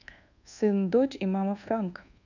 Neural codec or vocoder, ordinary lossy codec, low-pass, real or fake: codec, 24 kHz, 1.2 kbps, DualCodec; none; 7.2 kHz; fake